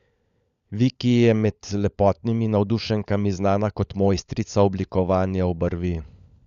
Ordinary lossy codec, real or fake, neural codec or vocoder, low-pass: none; fake; codec, 16 kHz, 16 kbps, FunCodec, trained on LibriTTS, 50 frames a second; 7.2 kHz